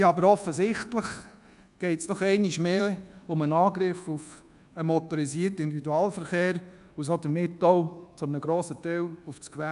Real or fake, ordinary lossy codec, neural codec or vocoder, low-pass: fake; none; codec, 24 kHz, 1.2 kbps, DualCodec; 10.8 kHz